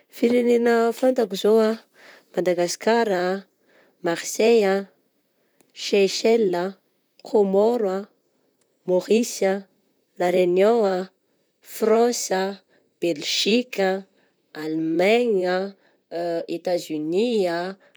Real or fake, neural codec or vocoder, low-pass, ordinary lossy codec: fake; vocoder, 44.1 kHz, 128 mel bands, Pupu-Vocoder; none; none